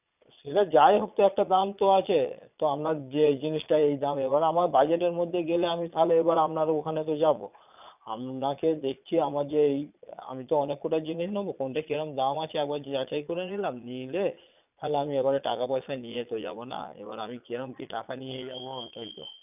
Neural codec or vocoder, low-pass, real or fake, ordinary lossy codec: vocoder, 22.05 kHz, 80 mel bands, Vocos; 3.6 kHz; fake; none